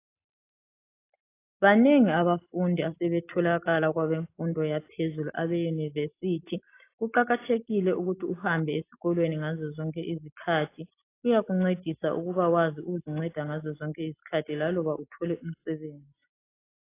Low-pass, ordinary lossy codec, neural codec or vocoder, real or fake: 3.6 kHz; AAC, 24 kbps; none; real